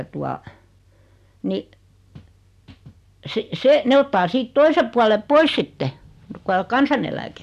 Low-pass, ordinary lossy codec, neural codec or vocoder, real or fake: 14.4 kHz; MP3, 96 kbps; none; real